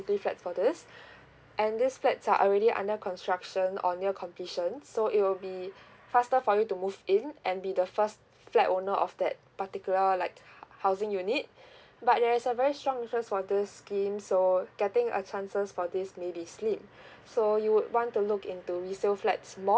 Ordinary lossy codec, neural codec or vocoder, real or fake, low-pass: none; none; real; none